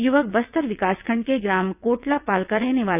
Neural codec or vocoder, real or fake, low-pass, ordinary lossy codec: vocoder, 22.05 kHz, 80 mel bands, WaveNeXt; fake; 3.6 kHz; none